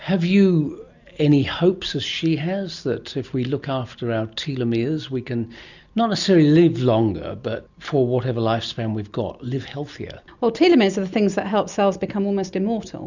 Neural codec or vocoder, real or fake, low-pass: none; real; 7.2 kHz